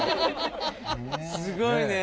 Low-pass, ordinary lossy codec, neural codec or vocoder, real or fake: none; none; none; real